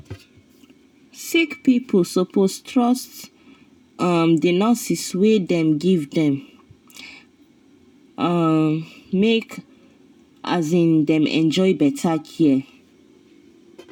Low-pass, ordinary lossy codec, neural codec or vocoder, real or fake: 19.8 kHz; none; none; real